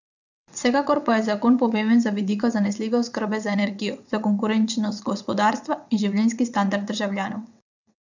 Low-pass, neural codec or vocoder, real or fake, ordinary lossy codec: 7.2 kHz; vocoder, 22.05 kHz, 80 mel bands, WaveNeXt; fake; none